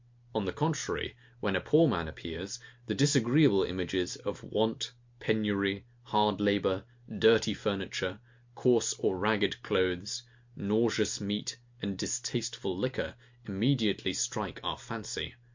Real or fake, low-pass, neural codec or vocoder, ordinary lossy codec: real; 7.2 kHz; none; MP3, 48 kbps